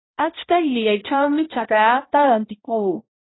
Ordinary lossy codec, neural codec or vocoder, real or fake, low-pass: AAC, 16 kbps; codec, 16 kHz, 0.5 kbps, X-Codec, HuBERT features, trained on balanced general audio; fake; 7.2 kHz